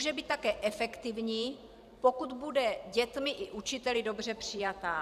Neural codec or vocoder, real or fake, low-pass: vocoder, 44.1 kHz, 128 mel bands every 512 samples, BigVGAN v2; fake; 14.4 kHz